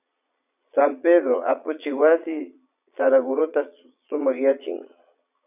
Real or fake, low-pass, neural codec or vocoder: fake; 3.6 kHz; vocoder, 22.05 kHz, 80 mel bands, Vocos